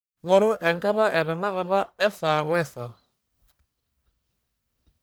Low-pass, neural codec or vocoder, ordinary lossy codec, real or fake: none; codec, 44.1 kHz, 1.7 kbps, Pupu-Codec; none; fake